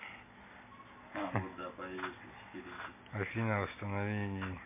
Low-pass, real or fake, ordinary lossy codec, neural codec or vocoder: 3.6 kHz; real; MP3, 24 kbps; none